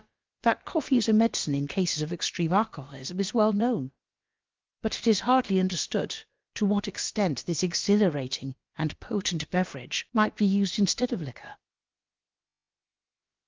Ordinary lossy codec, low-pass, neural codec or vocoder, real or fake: Opus, 32 kbps; 7.2 kHz; codec, 16 kHz, about 1 kbps, DyCAST, with the encoder's durations; fake